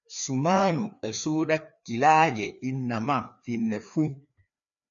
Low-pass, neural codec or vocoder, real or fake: 7.2 kHz; codec, 16 kHz, 2 kbps, FreqCodec, larger model; fake